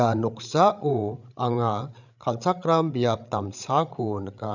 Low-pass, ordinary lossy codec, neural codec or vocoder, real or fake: 7.2 kHz; none; codec, 16 kHz, 8 kbps, FreqCodec, larger model; fake